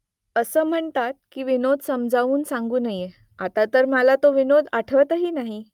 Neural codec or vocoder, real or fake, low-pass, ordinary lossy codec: none; real; 19.8 kHz; Opus, 32 kbps